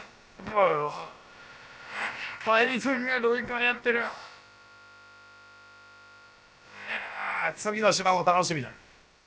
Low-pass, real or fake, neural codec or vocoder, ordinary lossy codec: none; fake; codec, 16 kHz, about 1 kbps, DyCAST, with the encoder's durations; none